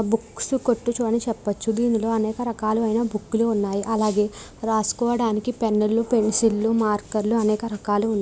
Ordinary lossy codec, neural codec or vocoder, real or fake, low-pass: none; none; real; none